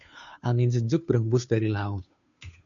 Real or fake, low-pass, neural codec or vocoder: fake; 7.2 kHz; codec, 16 kHz, 2 kbps, FunCodec, trained on Chinese and English, 25 frames a second